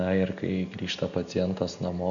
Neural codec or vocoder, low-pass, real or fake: none; 7.2 kHz; real